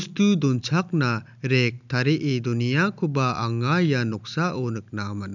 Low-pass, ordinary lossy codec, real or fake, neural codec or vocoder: 7.2 kHz; none; real; none